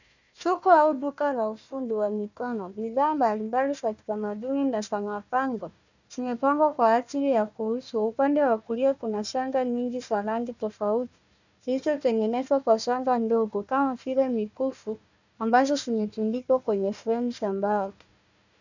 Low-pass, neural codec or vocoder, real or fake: 7.2 kHz; codec, 16 kHz, 1 kbps, FunCodec, trained on Chinese and English, 50 frames a second; fake